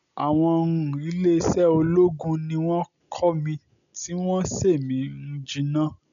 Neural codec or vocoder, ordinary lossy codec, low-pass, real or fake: none; none; 7.2 kHz; real